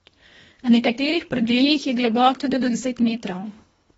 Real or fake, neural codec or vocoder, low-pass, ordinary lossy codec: fake; codec, 24 kHz, 1.5 kbps, HILCodec; 10.8 kHz; AAC, 24 kbps